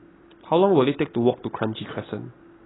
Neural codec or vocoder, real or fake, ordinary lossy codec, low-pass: none; real; AAC, 16 kbps; 7.2 kHz